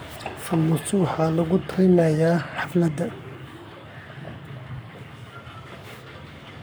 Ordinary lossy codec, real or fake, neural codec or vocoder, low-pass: none; fake; codec, 44.1 kHz, 7.8 kbps, Pupu-Codec; none